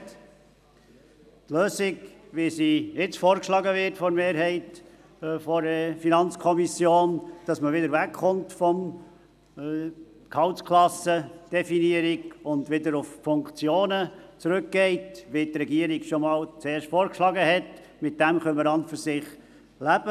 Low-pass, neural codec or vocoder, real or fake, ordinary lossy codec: 14.4 kHz; none; real; none